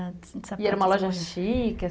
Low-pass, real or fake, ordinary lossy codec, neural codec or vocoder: none; real; none; none